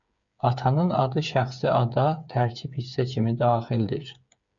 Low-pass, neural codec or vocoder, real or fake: 7.2 kHz; codec, 16 kHz, 8 kbps, FreqCodec, smaller model; fake